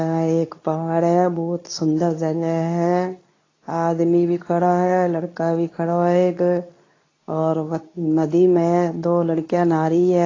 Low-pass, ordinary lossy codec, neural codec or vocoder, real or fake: 7.2 kHz; AAC, 32 kbps; codec, 24 kHz, 0.9 kbps, WavTokenizer, medium speech release version 2; fake